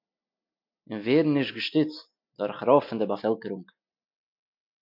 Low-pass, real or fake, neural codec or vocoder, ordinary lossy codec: 5.4 kHz; real; none; MP3, 48 kbps